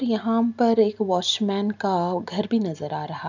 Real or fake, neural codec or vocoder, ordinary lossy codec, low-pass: real; none; none; 7.2 kHz